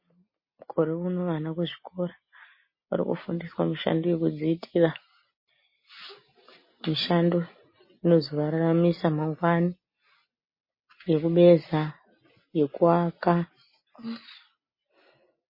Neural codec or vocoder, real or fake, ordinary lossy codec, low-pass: none; real; MP3, 24 kbps; 5.4 kHz